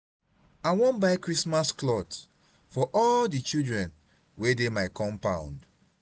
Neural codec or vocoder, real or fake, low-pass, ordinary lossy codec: none; real; none; none